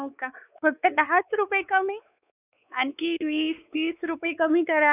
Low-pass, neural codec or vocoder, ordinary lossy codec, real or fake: 3.6 kHz; codec, 16 kHz, 4 kbps, X-Codec, WavLM features, trained on Multilingual LibriSpeech; none; fake